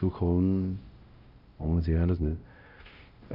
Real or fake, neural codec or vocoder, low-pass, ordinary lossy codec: fake; codec, 16 kHz, 0.5 kbps, X-Codec, WavLM features, trained on Multilingual LibriSpeech; 5.4 kHz; Opus, 24 kbps